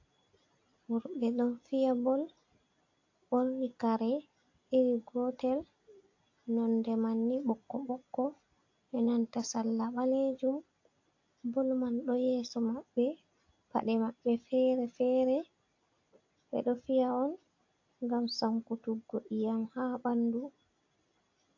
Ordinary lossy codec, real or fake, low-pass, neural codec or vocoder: AAC, 48 kbps; real; 7.2 kHz; none